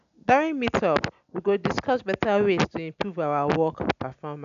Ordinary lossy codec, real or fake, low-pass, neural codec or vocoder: none; real; 7.2 kHz; none